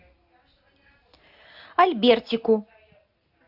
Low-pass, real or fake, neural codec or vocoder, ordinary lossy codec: 5.4 kHz; real; none; AAC, 48 kbps